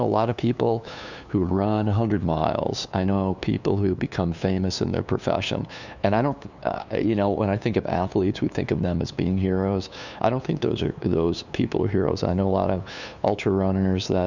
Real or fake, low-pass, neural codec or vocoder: fake; 7.2 kHz; codec, 16 kHz, 2 kbps, FunCodec, trained on LibriTTS, 25 frames a second